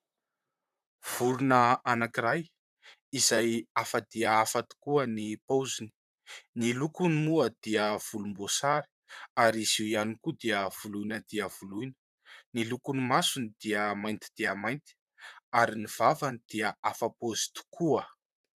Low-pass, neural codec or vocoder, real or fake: 14.4 kHz; vocoder, 44.1 kHz, 128 mel bands, Pupu-Vocoder; fake